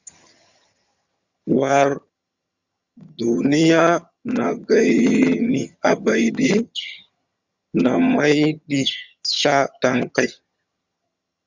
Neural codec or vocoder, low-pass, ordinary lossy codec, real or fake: vocoder, 22.05 kHz, 80 mel bands, HiFi-GAN; 7.2 kHz; Opus, 64 kbps; fake